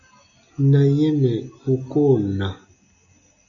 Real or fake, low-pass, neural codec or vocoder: real; 7.2 kHz; none